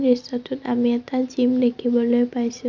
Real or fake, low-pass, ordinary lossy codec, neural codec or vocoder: real; 7.2 kHz; none; none